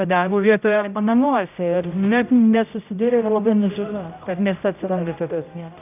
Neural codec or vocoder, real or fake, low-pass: codec, 16 kHz, 0.5 kbps, X-Codec, HuBERT features, trained on general audio; fake; 3.6 kHz